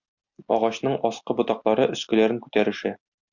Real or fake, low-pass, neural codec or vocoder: real; 7.2 kHz; none